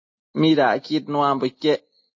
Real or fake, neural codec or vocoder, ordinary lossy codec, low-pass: real; none; MP3, 32 kbps; 7.2 kHz